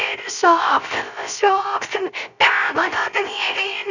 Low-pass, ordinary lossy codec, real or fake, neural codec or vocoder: 7.2 kHz; none; fake; codec, 16 kHz, 0.3 kbps, FocalCodec